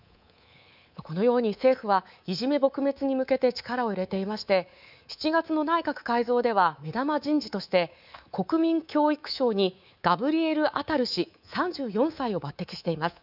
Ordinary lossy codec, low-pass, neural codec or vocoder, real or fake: none; 5.4 kHz; codec, 24 kHz, 3.1 kbps, DualCodec; fake